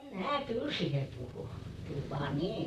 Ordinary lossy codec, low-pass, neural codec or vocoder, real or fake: none; 14.4 kHz; vocoder, 44.1 kHz, 128 mel bands, Pupu-Vocoder; fake